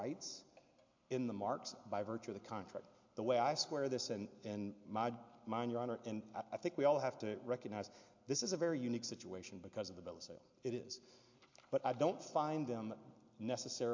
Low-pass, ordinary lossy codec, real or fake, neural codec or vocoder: 7.2 kHz; MP3, 48 kbps; real; none